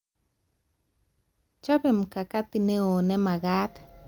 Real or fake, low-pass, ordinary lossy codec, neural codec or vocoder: real; 19.8 kHz; Opus, 32 kbps; none